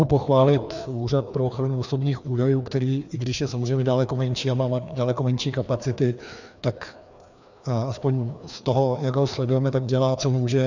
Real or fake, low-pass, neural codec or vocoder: fake; 7.2 kHz; codec, 16 kHz, 2 kbps, FreqCodec, larger model